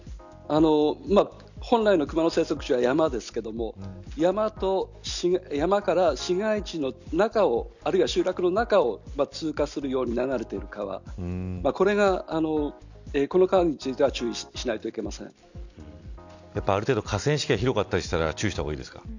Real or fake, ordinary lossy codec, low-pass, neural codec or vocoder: real; none; 7.2 kHz; none